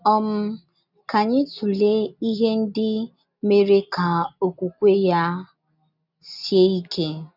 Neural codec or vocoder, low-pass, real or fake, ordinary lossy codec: none; 5.4 kHz; real; none